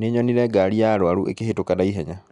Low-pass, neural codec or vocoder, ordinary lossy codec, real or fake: 10.8 kHz; none; none; real